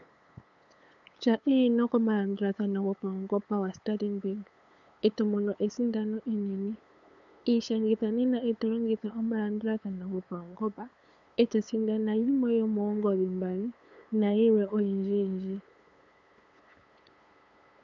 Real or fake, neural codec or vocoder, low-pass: fake; codec, 16 kHz, 8 kbps, FunCodec, trained on LibriTTS, 25 frames a second; 7.2 kHz